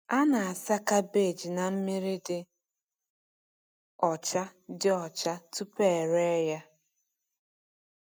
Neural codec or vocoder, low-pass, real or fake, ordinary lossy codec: none; none; real; none